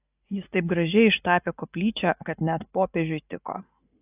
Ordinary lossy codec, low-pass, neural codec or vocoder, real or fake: AAC, 32 kbps; 3.6 kHz; none; real